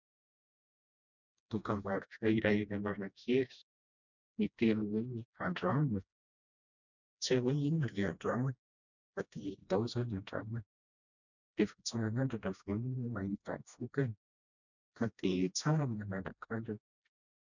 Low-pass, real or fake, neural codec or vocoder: 7.2 kHz; fake; codec, 16 kHz, 1 kbps, FreqCodec, smaller model